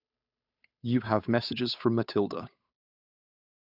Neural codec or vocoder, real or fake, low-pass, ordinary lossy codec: codec, 16 kHz, 8 kbps, FunCodec, trained on Chinese and English, 25 frames a second; fake; 5.4 kHz; none